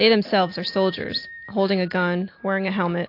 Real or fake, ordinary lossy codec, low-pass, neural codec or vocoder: real; AAC, 32 kbps; 5.4 kHz; none